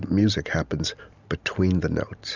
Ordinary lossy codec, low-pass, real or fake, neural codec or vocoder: Opus, 64 kbps; 7.2 kHz; real; none